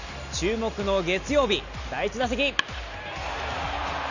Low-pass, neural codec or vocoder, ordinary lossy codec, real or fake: 7.2 kHz; none; none; real